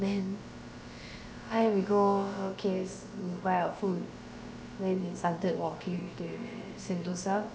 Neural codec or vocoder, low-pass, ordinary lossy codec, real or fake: codec, 16 kHz, about 1 kbps, DyCAST, with the encoder's durations; none; none; fake